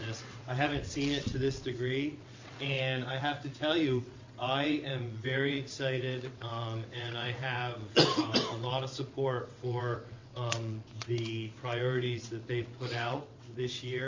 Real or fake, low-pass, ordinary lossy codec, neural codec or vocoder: fake; 7.2 kHz; MP3, 48 kbps; vocoder, 22.05 kHz, 80 mel bands, WaveNeXt